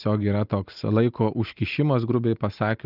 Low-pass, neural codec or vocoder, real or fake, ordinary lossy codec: 5.4 kHz; none; real; Opus, 24 kbps